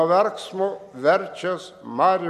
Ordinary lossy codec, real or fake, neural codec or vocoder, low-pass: MP3, 64 kbps; real; none; 14.4 kHz